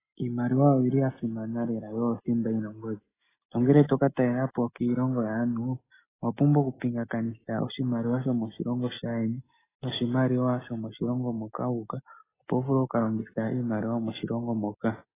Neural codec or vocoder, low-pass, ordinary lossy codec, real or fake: none; 3.6 kHz; AAC, 16 kbps; real